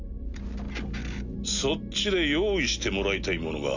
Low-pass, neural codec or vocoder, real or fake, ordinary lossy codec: 7.2 kHz; none; real; none